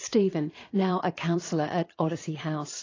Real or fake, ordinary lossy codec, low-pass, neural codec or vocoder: fake; AAC, 32 kbps; 7.2 kHz; vocoder, 22.05 kHz, 80 mel bands, Vocos